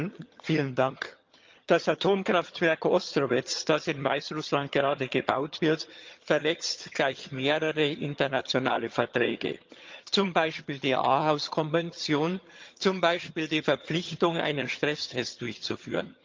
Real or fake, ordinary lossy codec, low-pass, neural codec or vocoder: fake; Opus, 24 kbps; 7.2 kHz; vocoder, 22.05 kHz, 80 mel bands, HiFi-GAN